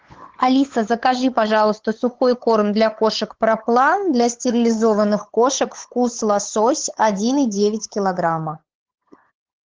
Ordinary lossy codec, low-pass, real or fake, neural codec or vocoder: Opus, 16 kbps; 7.2 kHz; fake; codec, 16 kHz, 8 kbps, FunCodec, trained on LibriTTS, 25 frames a second